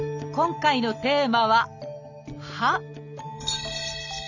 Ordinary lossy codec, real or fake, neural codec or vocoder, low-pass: none; real; none; 7.2 kHz